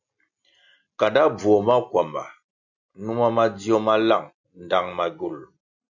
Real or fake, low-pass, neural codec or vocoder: real; 7.2 kHz; none